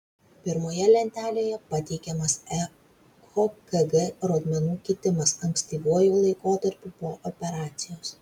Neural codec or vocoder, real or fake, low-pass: none; real; 19.8 kHz